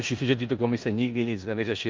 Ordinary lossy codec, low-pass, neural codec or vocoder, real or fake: Opus, 24 kbps; 7.2 kHz; codec, 16 kHz in and 24 kHz out, 0.9 kbps, LongCat-Audio-Codec, four codebook decoder; fake